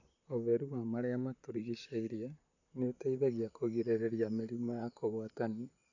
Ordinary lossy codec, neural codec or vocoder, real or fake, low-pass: none; vocoder, 44.1 kHz, 128 mel bands, Pupu-Vocoder; fake; 7.2 kHz